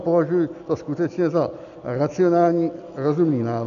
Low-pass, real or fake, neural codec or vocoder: 7.2 kHz; real; none